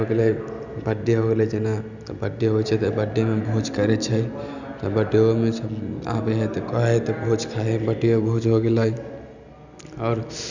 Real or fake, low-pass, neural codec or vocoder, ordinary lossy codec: real; 7.2 kHz; none; none